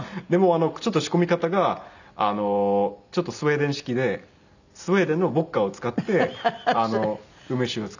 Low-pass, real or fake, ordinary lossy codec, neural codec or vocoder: 7.2 kHz; real; none; none